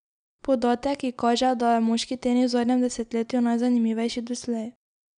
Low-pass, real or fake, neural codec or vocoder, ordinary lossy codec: 9.9 kHz; real; none; none